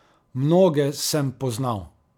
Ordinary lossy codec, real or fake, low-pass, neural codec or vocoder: none; real; 19.8 kHz; none